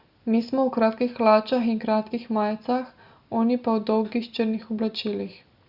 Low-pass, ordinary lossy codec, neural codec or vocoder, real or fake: 5.4 kHz; Opus, 64 kbps; none; real